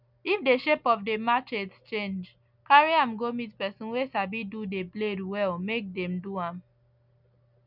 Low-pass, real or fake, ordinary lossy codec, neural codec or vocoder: 5.4 kHz; real; none; none